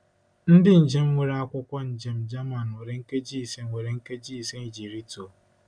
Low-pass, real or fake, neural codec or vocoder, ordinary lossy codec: 9.9 kHz; real; none; none